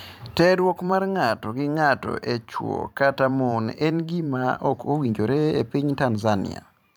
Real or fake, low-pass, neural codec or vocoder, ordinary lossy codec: fake; none; vocoder, 44.1 kHz, 128 mel bands every 512 samples, BigVGAN v2; none